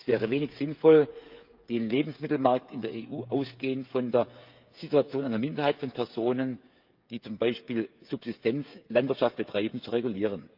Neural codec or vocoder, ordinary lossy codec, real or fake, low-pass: codec, 16 kHz, 16 kbps, FreqCodec, smaller model; Opus, 24 kbps; fake; 5.4 kHz